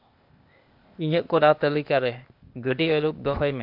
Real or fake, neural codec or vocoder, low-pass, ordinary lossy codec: fake; codec, 16 kHz, 0.8 kbps, ZipCodec; 5.4 kHz; MP3, 48 kbps